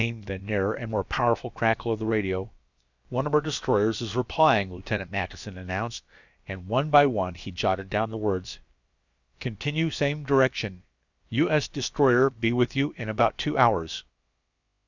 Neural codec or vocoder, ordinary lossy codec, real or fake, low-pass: codec, 16 kHz, about 1 kbps, DyCAST, with the encoder's durations; Opus, 64 kbps; fake; 7.2 kHz